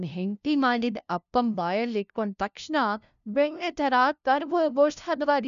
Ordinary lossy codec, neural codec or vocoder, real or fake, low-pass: none; codec, 16 kHz, 0.5 kbps, FunCodec, trained on LibriTTS, 25 frames a second; fake; 7.2 kHz